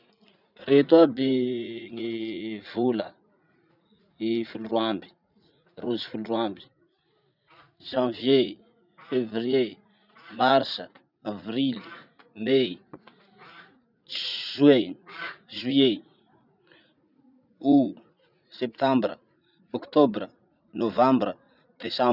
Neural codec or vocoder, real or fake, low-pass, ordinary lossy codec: vocoder, 44.1 kHz, 80 mel bands, Vocos; fake; 5.4 kHz; none